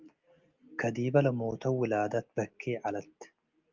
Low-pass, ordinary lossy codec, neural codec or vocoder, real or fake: 7.2 kHz; Opus, 24 kbps; none; real